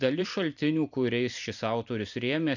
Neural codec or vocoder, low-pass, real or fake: vocoder, 44.1 kHz, 128 mel bands every 256 samples, BigVGAN v2; 7.2 kHz; fake